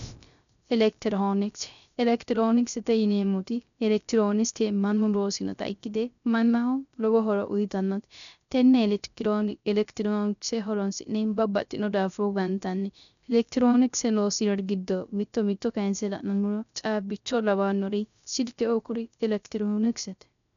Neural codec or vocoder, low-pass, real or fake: codec, 16 kHz, 0.3 kbps, FocalCodec; 7.2 kHz; fake